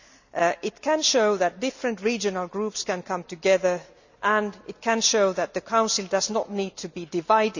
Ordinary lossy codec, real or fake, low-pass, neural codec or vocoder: none; real; 7.2 kHz; none